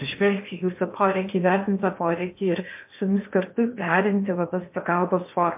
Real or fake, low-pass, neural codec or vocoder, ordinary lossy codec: fake; 3.6 kHz; codec, 16 kHz in and 24 kHz out, 0.8 kbps, FocalCodec, streaming, 65536 codes; MP3, 32 kbps